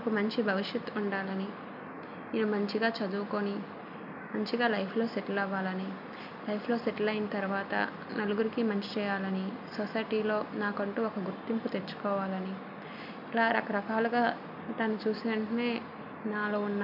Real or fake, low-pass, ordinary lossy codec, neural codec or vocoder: real; 5.4 kHz; none; none